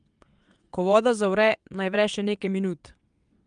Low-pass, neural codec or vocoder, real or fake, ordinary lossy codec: 9.9 kHz; vocoder, 22.05 kHz, 80 mel bands, WaveNeXt; fake; Opus, 32 kbps